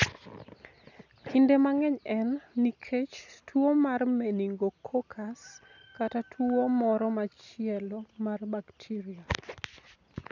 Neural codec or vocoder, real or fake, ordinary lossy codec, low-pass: none; real; none; 7.2 kHz